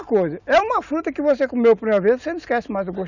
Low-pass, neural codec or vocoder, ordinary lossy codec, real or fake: 7.2 kHz; none; none; real